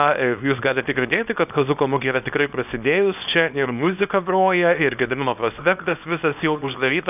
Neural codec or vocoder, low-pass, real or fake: codec, 24 kHz, 0.9 kbps, WavTokenizer, small release; 3.6 kHz; fake